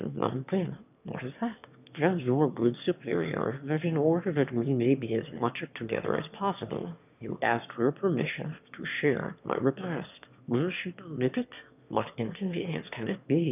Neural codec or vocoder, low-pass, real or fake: autoencoder, 22.05 kHz, a latent of 192 numbers a frame, VITS, trained on one speaker; 3.6 kHz; fake